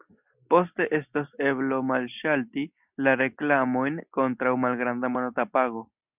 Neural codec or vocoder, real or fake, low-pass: none; real; 3.6 kHz